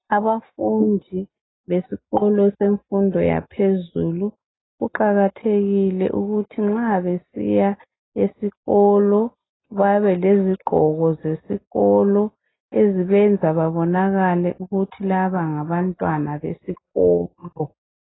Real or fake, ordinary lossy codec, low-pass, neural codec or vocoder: real; AAC, 16 kbps; 7.2 kHz; none